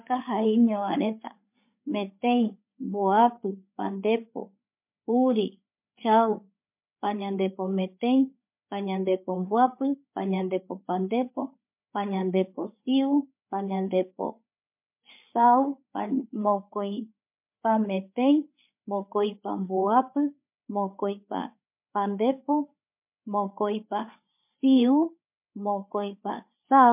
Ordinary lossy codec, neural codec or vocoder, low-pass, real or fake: MP3, 32 kbps; codec, 16 kHz, 8 kbps, FreqCodec, larger model; 3.6 kHz; fake